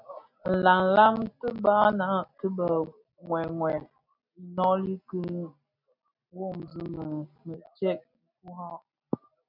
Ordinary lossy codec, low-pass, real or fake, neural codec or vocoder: MP3, 48 kbps; 5.4 kHz; real; none